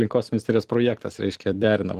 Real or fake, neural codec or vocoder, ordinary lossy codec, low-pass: fake; vocoder, 44.1 kHz, 128 mel bands every 256 samples, BigVGAN v2; Opus, 24 kbps; 14.4 kHz